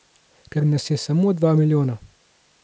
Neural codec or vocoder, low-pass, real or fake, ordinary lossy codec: none; none; real; none